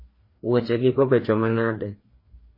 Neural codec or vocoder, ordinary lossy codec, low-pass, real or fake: codec, 16 kHz, 2 kbps, FreqCodec, larger model; MP3, 24 kbps; 5.4 kHz; fake